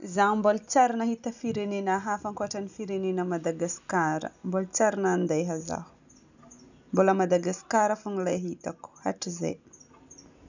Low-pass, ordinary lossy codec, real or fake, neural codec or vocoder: 7.2 kHz; none; real; none